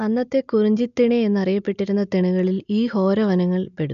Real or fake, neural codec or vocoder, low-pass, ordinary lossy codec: real; none; 7.2 kHz; none